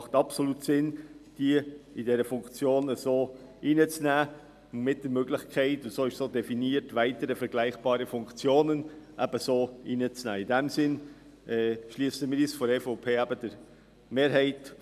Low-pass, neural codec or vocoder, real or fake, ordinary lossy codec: 14.4 kHz; none; real; none